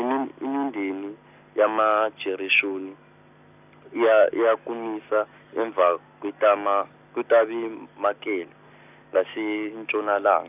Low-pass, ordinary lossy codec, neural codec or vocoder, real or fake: 3.6 kHz; none; none; real